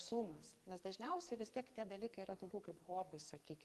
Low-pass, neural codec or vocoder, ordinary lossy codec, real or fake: 14.4 kHz; codec, 44.1 kHz, 2.6 kbps, SNAC; Opus, 24 kbps; fake